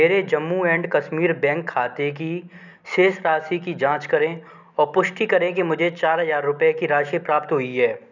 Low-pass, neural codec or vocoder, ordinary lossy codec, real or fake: 7.2 kHz; none; none; real